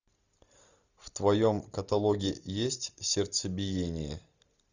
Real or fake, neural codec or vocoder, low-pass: real; none; 7.2 kHz